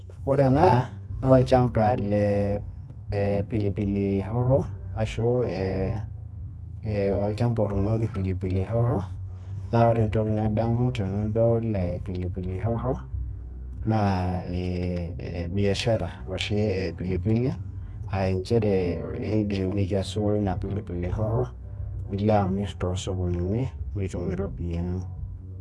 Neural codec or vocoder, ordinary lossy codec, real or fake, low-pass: codec, 24 kHz, 0.9 kbps, WavTokenizer, medium music audio release; none; fake; none